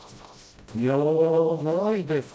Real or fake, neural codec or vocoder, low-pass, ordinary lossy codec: fake; codec, 16 kHz, 0.5 kbps, FreqCodec, smaller model; none; none